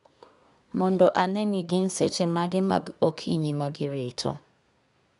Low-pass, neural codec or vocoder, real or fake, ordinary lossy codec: 10.8 kHz; codec, 24 kHz, 1 kbps, SNAC; fake; none